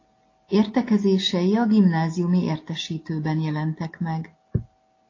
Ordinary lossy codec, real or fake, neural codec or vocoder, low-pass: AAC, 32 kbps; real; none; 7.2 kHz